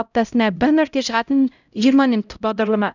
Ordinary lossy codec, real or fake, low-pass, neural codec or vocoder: none; fake; 7.2 kHz; codec, 16 kHz, 0.5 kbps, X-Codec, HuBERT features, trained on LibriSpeech